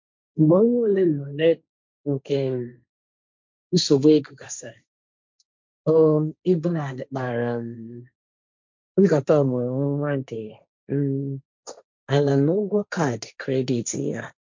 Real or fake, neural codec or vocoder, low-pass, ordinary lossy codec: fake; codec, 16 kHz, 1.1 kbps, Voila-Tokenizer; 7.2 kHz; MP3, 64 kbps